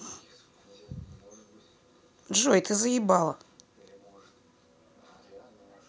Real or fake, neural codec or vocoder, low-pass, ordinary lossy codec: real; none; none; none